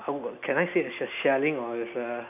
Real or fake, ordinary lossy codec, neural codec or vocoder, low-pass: real; none; none; 3.6 kHz